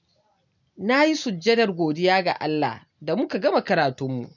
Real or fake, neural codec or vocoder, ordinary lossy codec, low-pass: real; none; none; 7.2 kHz